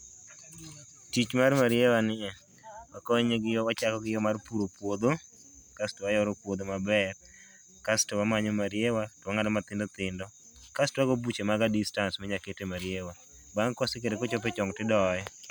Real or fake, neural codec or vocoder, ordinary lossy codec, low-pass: real; none; none; none